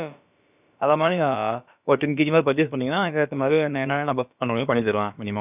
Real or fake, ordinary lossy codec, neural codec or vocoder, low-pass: fake; none; codec, 16 kHz, about 1 kbps, DyCAST, with the encoder's durations; 3.6 kHz